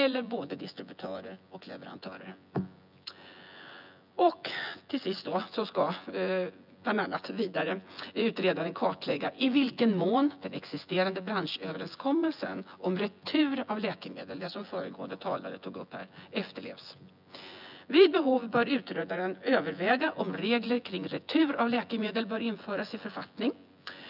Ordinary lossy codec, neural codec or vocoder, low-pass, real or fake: none; vocoder, 24 kHz, 100 mel bands, Vocos; 5.4 kHz; fake